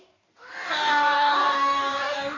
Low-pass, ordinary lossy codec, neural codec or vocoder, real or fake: 7.2 kHz; AAC, 32 kbps; codec, 44.1 kHz, 2.6 kbps, DAC; fake